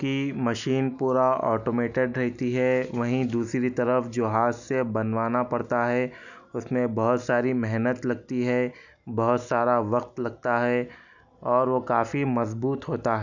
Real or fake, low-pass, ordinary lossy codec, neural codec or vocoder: real; 7.2 kHz; none; none